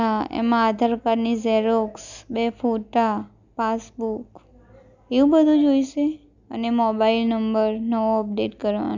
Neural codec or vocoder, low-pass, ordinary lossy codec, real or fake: none; 7.2 kHz; none; real